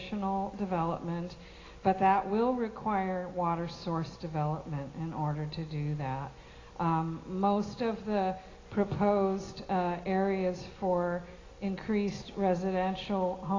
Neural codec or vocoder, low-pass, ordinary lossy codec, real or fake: none; 7.2 kHz; AAC, 32 kbps; real